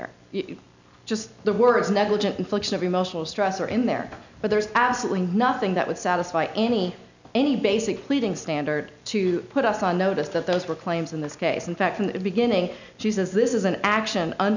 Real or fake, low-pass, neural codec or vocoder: real; 7.2 kHz; none